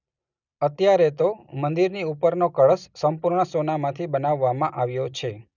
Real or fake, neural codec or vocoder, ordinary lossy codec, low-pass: real; none; none; 7.2 kHz